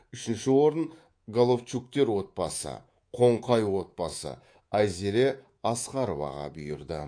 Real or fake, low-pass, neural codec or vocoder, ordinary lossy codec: fake; 9.9 kHz; codec, 24 kHz, 3.1 kbps, DualCodec; AAC, 48 kbps